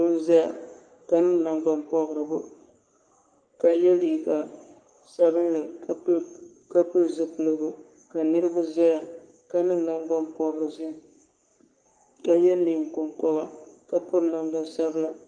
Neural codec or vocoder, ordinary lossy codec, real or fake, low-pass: codec, 44.1 kHz, 3.4 kbps, Pupu-Codec; Opus, 32 kbps; fake; 9.9 kHz